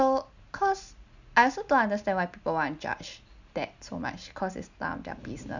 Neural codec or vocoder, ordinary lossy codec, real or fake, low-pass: none; none; real; 7.2 kHz